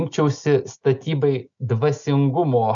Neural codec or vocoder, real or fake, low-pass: none; real; 7.2 kHz